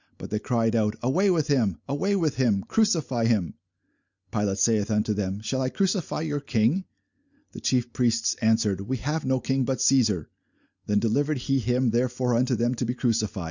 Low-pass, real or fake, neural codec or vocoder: 7.2 kHz; real; none